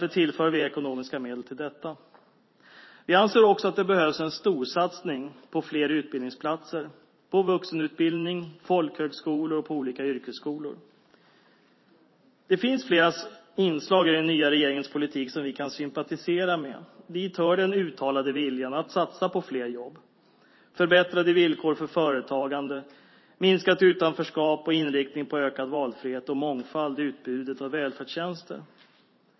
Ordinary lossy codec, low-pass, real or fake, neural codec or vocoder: MP3, 24 kbps; 7.2 kHz; fake; vocoder, 44.1 kHz, 128 mel bands every 512 samples, BigVGAN v2